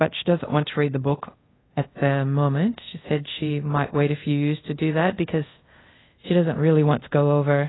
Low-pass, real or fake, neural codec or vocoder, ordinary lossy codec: 7.2 kHz; fake; codec, 24 kHz, 0.5 kbps, DualCodec; AAC, 16 kbps